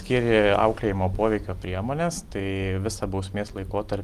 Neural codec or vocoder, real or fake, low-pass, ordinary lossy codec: none; real; 14.4 kHz; Opus, 32 kbps